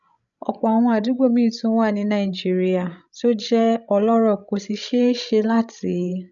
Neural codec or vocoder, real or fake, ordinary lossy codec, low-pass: codec, 16 kHz, 16 kbps, FreqCodec, larger model; fake; none; 7.2 kHz